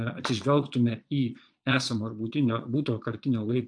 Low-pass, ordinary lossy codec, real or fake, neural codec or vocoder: 9.9 kHz; AAC, 64 kbps; fake; vocoder, 22.05 kHz, 80 mel bands, Vocos